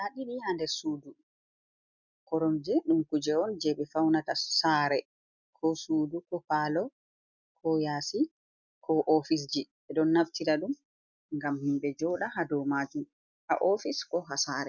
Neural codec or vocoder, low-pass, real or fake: none; 7.2 kHz; real